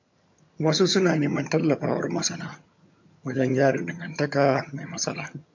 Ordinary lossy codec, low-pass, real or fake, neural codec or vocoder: MP3, 48 kbps; 7.2 kHz; fake; vocoder, 22.05 kHz, 80 mel bands, HiFi-GAN